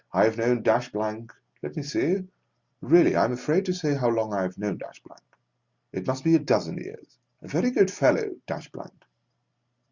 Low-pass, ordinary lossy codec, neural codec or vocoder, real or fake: 7.2 kHz; Opus, 64 kbps; none; real